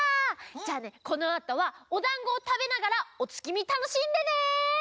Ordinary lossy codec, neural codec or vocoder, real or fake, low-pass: none; none; real; none